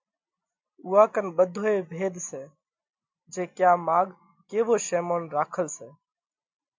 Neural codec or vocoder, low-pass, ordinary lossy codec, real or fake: none; 7.2 kHz; MP3, 64 kbps; real